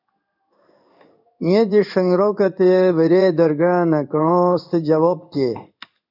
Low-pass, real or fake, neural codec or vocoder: 5.4 kHz; fake; codec, 16 kHz in and 24 kHz out, 1 kbps, XY-Tokenizer